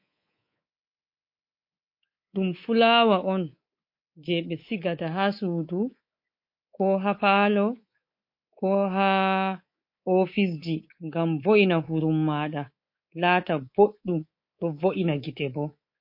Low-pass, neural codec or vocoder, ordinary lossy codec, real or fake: 5.4 kHz; codec, 24 kHz, 3.1 kbps, DualCodec; MP3, 32 kbps; fake